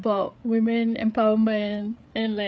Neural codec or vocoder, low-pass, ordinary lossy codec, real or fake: codec, 16 kHz, 8 kbps, FreqCodec, larger model; none; none; fake